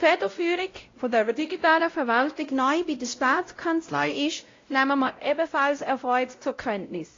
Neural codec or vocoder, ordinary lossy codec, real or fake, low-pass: codec, 16 kHz, 0.5 kbps, X-Codec, WavLM features, trained on Multilingual LibriSpeech; AAC, 32 kbps; fake; 7.2 kHz